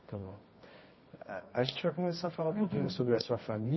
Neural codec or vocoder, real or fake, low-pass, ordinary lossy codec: codec, 24 kHz, 0.9 kbps, WavTokenizer, medium music audio release; fake; 7.2 kHz; MP3, 24 kbps